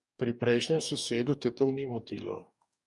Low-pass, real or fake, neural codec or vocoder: 10.8 kHz; fake; codec, 44.1 kHz, 2.6 kbps, DAC